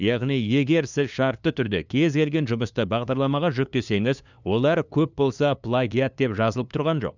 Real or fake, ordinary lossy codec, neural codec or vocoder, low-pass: fake; none; codec, 16 kHz, 2 kbps, FunCodec, trained on LibriTTS, 25 frames a second; 7.2 kHz